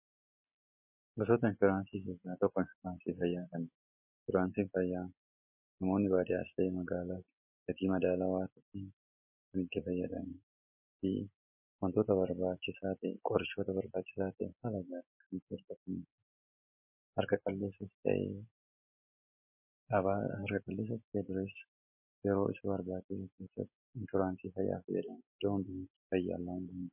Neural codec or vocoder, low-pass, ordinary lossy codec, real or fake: none; 3.6 kHz; MP3, 24 kbps; real